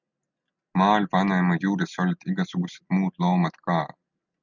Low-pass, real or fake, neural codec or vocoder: 7.2 kHz; real; none